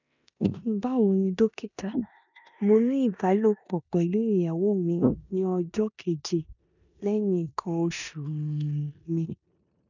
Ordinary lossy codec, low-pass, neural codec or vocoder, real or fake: none; 7.2 kHz; codec, 16 kHz in and 24 kHz out, 0.9 kbps, LongCat-Audio-Codec, four codebook decoder; fake